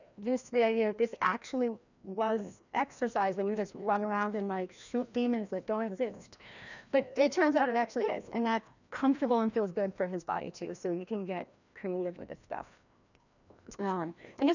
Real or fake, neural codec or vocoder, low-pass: fake; codec, 16 kHz, 1 kbps, FreqCodec, larger model; 7.2 kHz